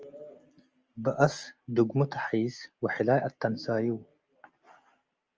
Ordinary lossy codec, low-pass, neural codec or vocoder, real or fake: Opus, 24 kbps; 7.2 kHz; none; real